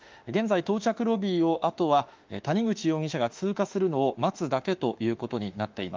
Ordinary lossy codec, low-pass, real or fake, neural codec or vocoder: Opus, 24 kbps; 7.2 kHz; fake; autoencoder, 48 kHz, 32 numbers a frame, DAC-VAE, trained on Japanese speech